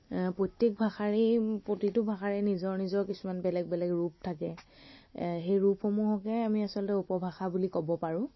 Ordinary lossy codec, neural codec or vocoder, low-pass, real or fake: MP3, 24 kbps; none; 7.2 kHz; real